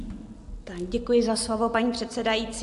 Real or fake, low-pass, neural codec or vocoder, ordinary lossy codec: real; 10.8 kHz; none; AAC, 64 kbps